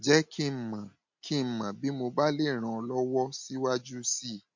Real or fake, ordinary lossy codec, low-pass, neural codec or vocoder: real; MP3, 48 kbps; 7.2 kHz; none